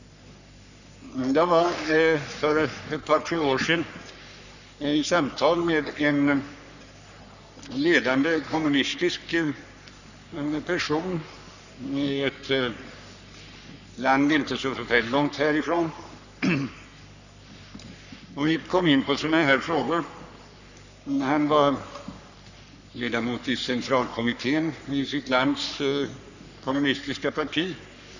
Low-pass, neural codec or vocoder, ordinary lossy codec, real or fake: 7.2 kHz; codec, 44.1 kHz, 3.4 kbps, Pupu-Codec; none; fake